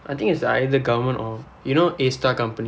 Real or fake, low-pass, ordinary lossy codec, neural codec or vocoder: real; none; none; none